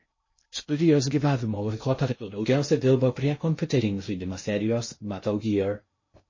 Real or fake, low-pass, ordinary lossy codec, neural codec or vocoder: fake; 7.2 kHz; MP3, 32 kbps; codec, 16 kHz in and 24 kHz out, 0.6 kbps, FocalCodec, streaming, 2048 codes